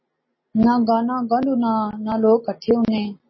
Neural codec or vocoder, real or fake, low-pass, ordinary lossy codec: none; real; 7.2 kHz; MP3, 24 kbps